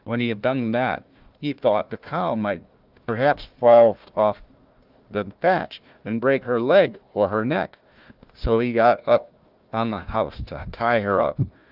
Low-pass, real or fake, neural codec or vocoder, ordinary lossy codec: 5.4 kHz; fake; codec, 16 kHz, 1 kbps, FunCodec, trained on Chinese and English, 50 frames a second; Opus, 32 kbps